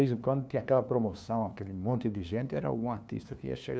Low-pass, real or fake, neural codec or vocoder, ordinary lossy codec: none; fake; codec, 16 kHz, 2 kbps, FunCodec, trained on LibriTTS, 25 frames a second; none